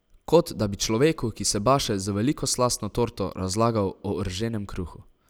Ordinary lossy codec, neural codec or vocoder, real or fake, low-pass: none; none; real; none